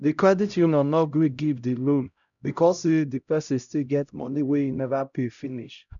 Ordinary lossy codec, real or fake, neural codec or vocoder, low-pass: none; fake; codec, 16 kHz, 0.5 kbps, X-Codec, HuBERT features, trained on LibriSpeech; 7.2 kHz